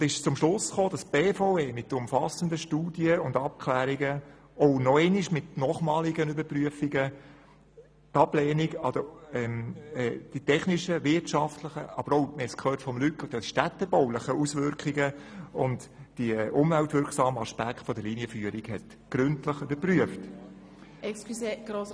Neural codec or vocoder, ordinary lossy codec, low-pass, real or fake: none; none; none; real